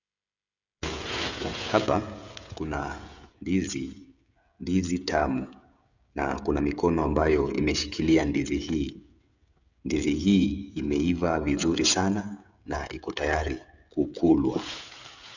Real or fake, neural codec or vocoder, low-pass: fake; codec, 16 kHz, 16 kbps, FreqCodec, smaller model; 7.2 kHz